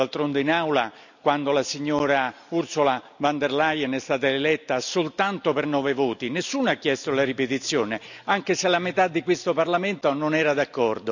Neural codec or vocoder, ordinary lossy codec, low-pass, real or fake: none; none; 7.2 kHz; real